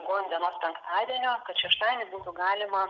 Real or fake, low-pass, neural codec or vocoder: real; 7.2 kHz; none